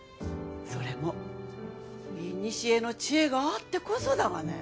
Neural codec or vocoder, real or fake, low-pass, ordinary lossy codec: none; real; none; none